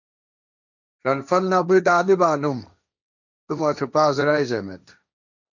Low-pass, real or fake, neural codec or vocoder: 7.2 kHz; fake; codec, 16 kHz, 1.1 kbps, Voila-Tokenizer